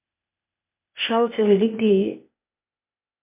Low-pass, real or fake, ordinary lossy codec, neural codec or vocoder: 3.6 kHz; fake; MP3, 32 kbps; codec, 16 kHz, 0.8 kbps, ZipCodec